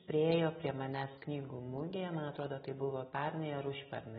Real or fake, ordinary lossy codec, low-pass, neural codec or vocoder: real; AAC, 16 kbps; 19.8 kHz; none